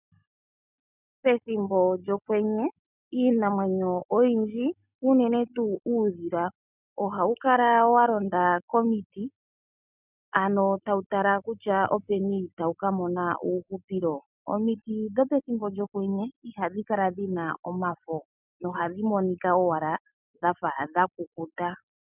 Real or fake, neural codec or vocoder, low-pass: real; none; 3.6 kHz